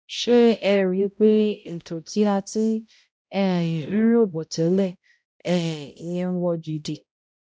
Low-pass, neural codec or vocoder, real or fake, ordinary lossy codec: none; codec, 16 kHz, 0.5 kbps, X-Codec, HuBERT features, trained on balanced general audio; fake; none